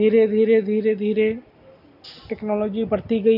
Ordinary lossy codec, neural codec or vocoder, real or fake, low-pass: none; none; real; 5.4 kHz